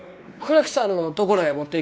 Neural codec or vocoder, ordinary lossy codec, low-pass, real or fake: codec, 16 kHz, 2 kbps, X-Codec, WavLM features, trained on Multilingual LibriSpeech; none; none; fake